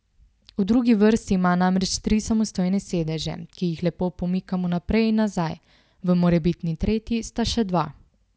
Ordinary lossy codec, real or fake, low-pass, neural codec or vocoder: none; real; none; none